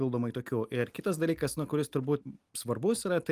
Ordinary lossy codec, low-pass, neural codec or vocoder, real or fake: Opus, 32 kbps; 14.4 kHz; none; real